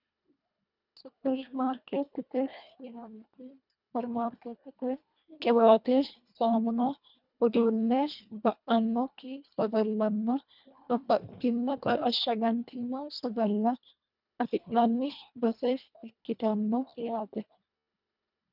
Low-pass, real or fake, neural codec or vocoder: 5.4 kHz; fake; codec, 24 kHz, 1.5 kbps, HILCodec